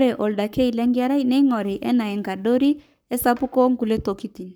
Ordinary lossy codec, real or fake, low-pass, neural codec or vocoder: none; fake; none; codec, 44.1 kHz, 7.8 kbps, Pupu-Codec